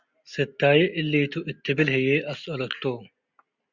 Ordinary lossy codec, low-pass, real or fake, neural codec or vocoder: Opus, 64 kbps; 7.2 kHz; real; none